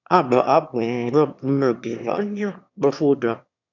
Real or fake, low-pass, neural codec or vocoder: fake; 7.2 kHz; autoencoder, 22.05 kHz, a latent of 192 numbers a frame, VITS, trained on one speaker